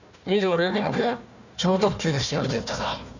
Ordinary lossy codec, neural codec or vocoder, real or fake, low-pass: none; codec, 16 kHz, 1 kbps, FunCodec, trained on Chinese and English, 50 frames a second; fake; 7.2 kHz